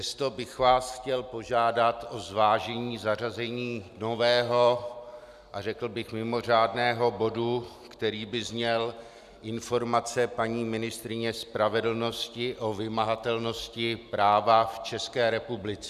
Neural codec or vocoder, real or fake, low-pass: none; real; 14.4 kHz